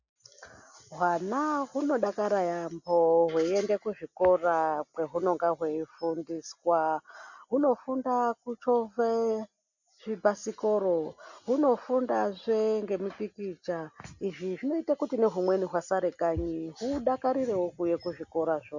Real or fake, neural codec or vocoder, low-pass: real; none; 7.2 kHz